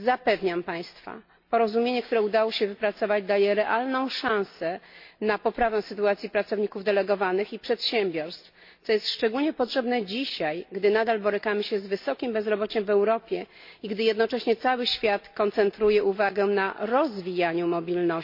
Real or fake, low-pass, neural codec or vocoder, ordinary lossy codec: real; 5.4 kHz; none; MP3, 32 kbps